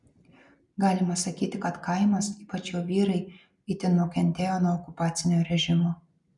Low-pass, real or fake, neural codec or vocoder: 10.8 kHz; real; none